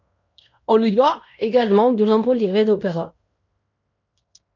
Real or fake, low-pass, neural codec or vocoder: fake; 7.2 kHz; codec, 16 kHz in and 24 kHz out, 0.9 kbps, LongCat-Audio-Codec, fine tuned four codebook decoder